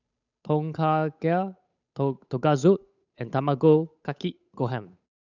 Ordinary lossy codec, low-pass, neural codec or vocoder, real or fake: none; 7.2 kHz; codec, 16 kHz, 8 kbps, FunCodec, trained on Chinese and English, 25 frames a second; fake